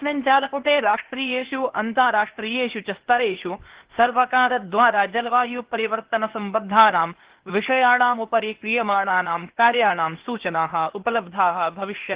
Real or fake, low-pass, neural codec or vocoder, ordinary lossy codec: fake; 3.6 kHz; codec, 16 kHz, 0.8 kbps, ZipCodec; Opus, 16 kbps